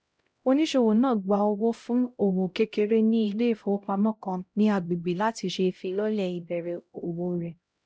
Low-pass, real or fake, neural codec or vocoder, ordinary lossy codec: none; fake; codec, 16 kHz, 0.5 kbps, X-Codec, HuBERT features, trained on LibriSpeech; none